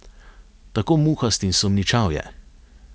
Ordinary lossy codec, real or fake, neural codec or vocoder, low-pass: none; real; none; none